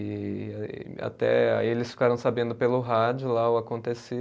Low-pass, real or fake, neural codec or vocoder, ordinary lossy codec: none; real; none; none